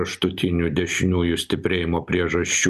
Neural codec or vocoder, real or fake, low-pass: none; real; 14.4 kHz